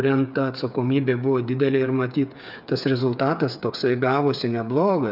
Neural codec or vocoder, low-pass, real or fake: codec, 16 kHz, 8 kbps, FreqCodec, smaller model; 5.4 kHz; fake